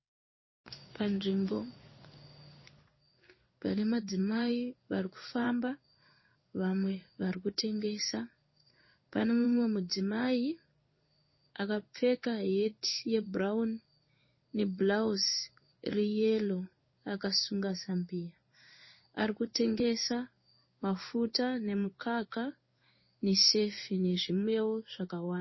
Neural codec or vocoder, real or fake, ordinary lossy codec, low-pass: codec, 16 kHz in and 24 kHz out, 1 kbps, XY-Tokenizer; fake; MP3, 24 kbps; 7.2 kHz